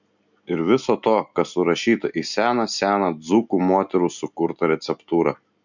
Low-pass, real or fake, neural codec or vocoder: 7.2 kHz; real; none